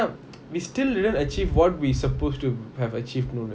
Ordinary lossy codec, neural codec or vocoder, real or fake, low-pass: none; none; real; none